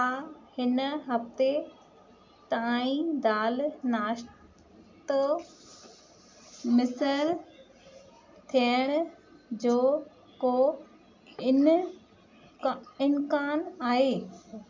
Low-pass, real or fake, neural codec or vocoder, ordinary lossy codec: 7.2 kHz; real; none; MP3, 64 kbps